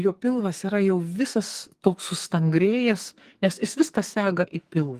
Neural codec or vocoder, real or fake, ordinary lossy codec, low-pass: codec, 44.1 kHz, 2.6 kbps, SNAC; fake; Opus, 24 kbps; 14.4 kHz